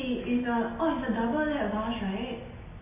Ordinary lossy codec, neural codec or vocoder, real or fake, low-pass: MP3, 16 kbps; none; real; 3.6 kHz